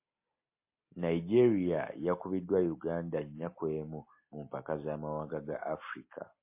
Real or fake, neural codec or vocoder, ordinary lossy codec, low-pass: real; none; MP3, 24 kbps; 3.6 kHz